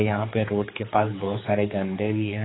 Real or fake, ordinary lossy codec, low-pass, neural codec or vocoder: fake; AAC, 16 kbps; 7.2 kHz; codec, 16 kHz, 4 kbps, X-Codec, HuBERT features, trained on general audio